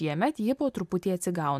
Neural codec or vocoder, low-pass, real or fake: none; 14.4 kHz; real